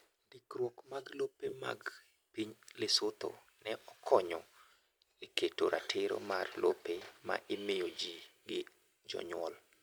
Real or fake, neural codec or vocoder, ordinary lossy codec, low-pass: real; none; none; none